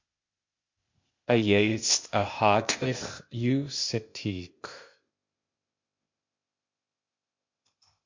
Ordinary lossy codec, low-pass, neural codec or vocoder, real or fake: MP3, 48 kbps; 7.2 kHz; codec, 16 kHz, 0.8 kbps, ZipCodec; fake